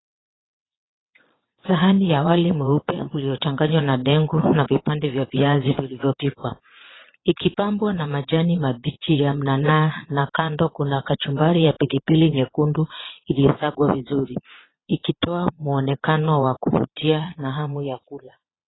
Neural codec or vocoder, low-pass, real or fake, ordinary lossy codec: vocoder, 22.05 kHz, 80 mel bands, Vocos; 7.2 kHz; fake; AAC, 16 kbps